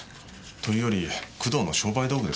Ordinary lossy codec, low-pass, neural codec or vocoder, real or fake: none; none; none; real